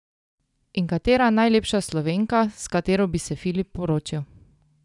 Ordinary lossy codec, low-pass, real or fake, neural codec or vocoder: none; 10.8 kHz; real; none